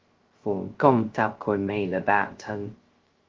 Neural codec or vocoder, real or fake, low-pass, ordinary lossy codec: codec, 16 kHz, 0.2 kbps, FocalCodec; fake; 7.2 kHz; Opus, 16 kbps